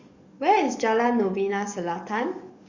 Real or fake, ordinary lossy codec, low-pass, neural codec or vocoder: fake; Opus, 64 kbps; 7.2 kHz; codec, 44.1 kHz, 7.8 kbps, DAC